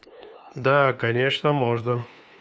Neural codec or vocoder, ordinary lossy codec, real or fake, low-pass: codec, 16 kHz, 2 kbps, FunCodec, trained on LibriTTS, 25 frames a second; none; fake; none